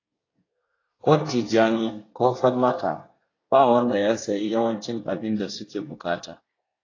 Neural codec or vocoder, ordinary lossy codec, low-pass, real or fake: codec, 24 kHz, 1 kbps, SNAC; AAC, 32 kbps; 7.2 kHz; fake